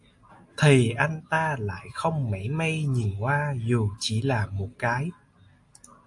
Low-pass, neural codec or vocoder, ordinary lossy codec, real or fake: 10.8 kHz; none; AAC, 64 kbps; real